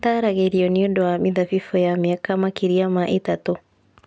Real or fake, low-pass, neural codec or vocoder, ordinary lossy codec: real; none; none; none